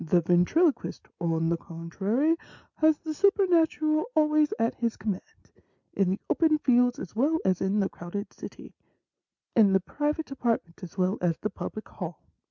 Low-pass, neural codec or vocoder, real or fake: 7.2 kHz; none; real